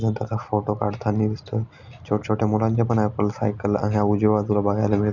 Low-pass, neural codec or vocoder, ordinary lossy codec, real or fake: 7.2 kHz; none; none; real